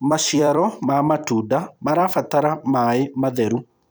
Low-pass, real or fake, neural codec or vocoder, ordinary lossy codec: none; fake; vocoder, 44.1 kHz, 128 mel bands every 256 samples, BigVGAN v2; none